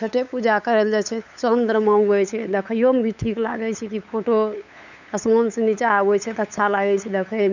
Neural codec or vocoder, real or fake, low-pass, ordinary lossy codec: codec, 16 kHz, 8 kbps, FunCodec, trained on LibriTTS, 25 frames a second; fake; 7.2 kHz; none